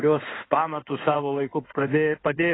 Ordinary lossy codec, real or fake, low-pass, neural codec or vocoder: AAC, 16 kbps; fake; 7.2 kHz; codec, 16 kHz in and 24 kHz out, 2.2 kbps, FireRedTTS-2 codec